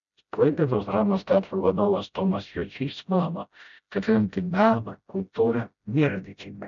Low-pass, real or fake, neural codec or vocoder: 7.2 kHz; fake; codec, 16 kHz, 0.5 kbps, FreqCodec, smaller model